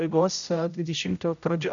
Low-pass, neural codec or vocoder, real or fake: 7.2 kHz; codec, 16 kHz, 0.5 kbps, X-Codec, HuBERT features, trained on general audio; fake